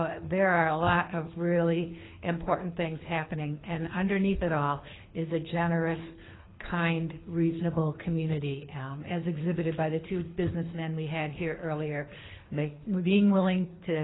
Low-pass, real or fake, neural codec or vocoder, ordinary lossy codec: 7.2 kHz; fake; codec, 24 kHz, 3 kbps, HILCodec; AAC, 16 kbps